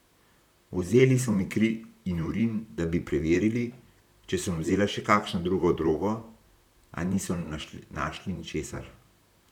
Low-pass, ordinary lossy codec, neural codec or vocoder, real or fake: 19.8 kHz; none; vocoder, 44.1 kHz, 128 mel bands, Pupu-Vocoder; fake